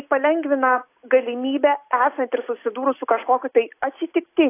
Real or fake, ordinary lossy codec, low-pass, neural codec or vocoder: real; AAC, 24 kbps; 3.6 kHz; none